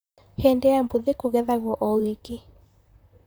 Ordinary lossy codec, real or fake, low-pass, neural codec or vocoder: none; fake; none; vocoder, 44.1 kHz, 128 mel bands, Pupu-Vocoder